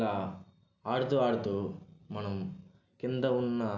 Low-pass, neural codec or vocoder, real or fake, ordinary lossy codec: 7.2 kHz; none; real; none